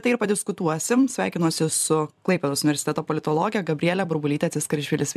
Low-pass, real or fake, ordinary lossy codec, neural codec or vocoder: 14.4 kHz; fake; MP3, 96 kbps; vocoder, 44.1 kHz, 128 mel bands every 256 samples, BigVGAN v2